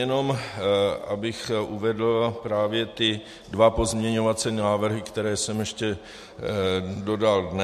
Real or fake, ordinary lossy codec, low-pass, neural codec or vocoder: real; MP3, 64 kbps; 14.4 kHz; none